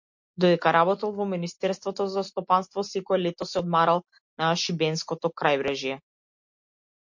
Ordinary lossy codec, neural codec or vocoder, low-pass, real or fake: MP3, 48 kbps; none; 7.2 kHz; real